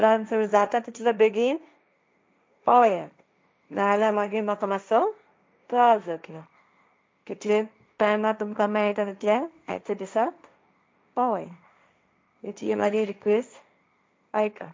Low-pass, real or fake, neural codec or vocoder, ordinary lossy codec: none; fake; codec, 16 kHz, 1.1 kbps, Voila-Tokenizer; none